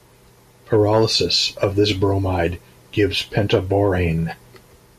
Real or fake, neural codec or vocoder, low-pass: real; none; 14.4 kHz